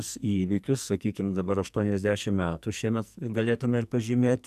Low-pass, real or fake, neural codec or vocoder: 14.4 kHz; fake; codec, 44.1 kHz, 2.6 kbps, SNAC